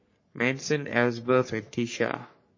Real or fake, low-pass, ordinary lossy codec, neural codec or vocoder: fake; 7.2 kHz; MP3, 32 kbps; codec, 44.1 kHz, 3.4 kbps, Pupu-Codec